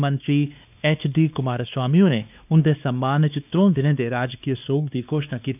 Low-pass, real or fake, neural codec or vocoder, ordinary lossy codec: 3.6 kHz; fake; codec, 16 kHz, 2 kbps, X-Codec, WavLM features, trained on Multilingual LibriSpeech; none